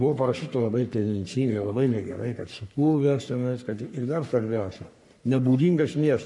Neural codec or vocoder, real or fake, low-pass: codec, 44.1 kHz, 3.4 kbps, Pupu-Codec; fake; 10.8 kHz